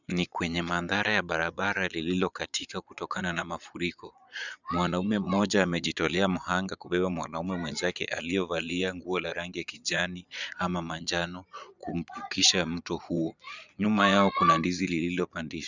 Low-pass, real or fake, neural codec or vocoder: 7.2 kHz; fake; vocoder, 22.05 kHz, 80 mel bands, Vocos